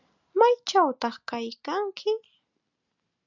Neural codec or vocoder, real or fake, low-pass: none; real; 7.2 kHz